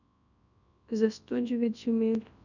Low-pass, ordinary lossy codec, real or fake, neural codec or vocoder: 7.2 kHz; none; fake; codec, 24 kHz, 0.9 kbps, WavTokenizer, large speech release